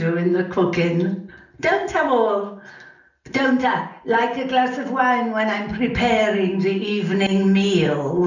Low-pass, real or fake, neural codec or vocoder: 7.2 kHz; real; none